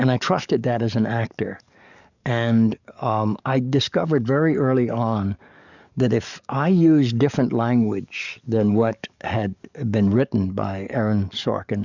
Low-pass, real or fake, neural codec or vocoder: 7.2 kHz; fake; codec, 44.1 kHz, 7.8 kbps, DAC